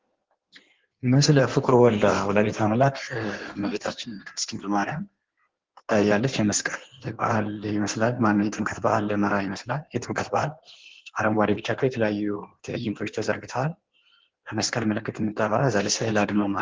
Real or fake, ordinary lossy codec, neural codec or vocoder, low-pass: fake; Opus, 16 kbps; codec, 16 kHz in and 24 kHz out, 1.1 kbps, FireRedTTS-2 codec; 7.2 kHz